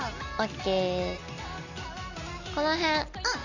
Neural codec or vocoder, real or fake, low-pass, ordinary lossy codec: none; real; 7.2 kHz; none